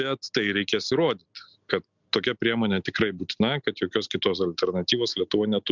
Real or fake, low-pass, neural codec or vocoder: real; 7.2 kHz; none